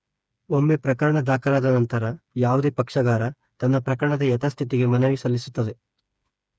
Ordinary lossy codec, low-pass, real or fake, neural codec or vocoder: none; none; fake; codec, 16 kHz, 4 kbps, FreqCodec, smaller model